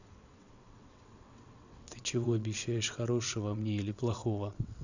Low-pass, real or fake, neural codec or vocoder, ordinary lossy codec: 7.2 kHz; fake; vocoder, 22.05 kHz, 80 mel bands, WaveNeXt; none